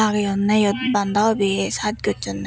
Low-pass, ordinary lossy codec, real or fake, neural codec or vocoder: none; none; real; none